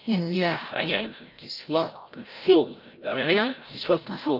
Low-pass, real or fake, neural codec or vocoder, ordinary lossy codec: 5.4 kHz; fake; codec, 16 kHz, 0.5 kbps, FreqCodec, larger model; Opus, 32 kbps